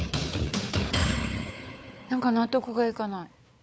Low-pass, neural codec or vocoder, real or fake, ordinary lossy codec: none; codec, 16 kHz, 4 kbps, FunCodec, trained on Chinese and English, 50 frames a second; fake; none